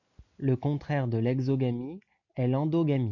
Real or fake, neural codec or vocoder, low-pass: real; none; 7.2 kHz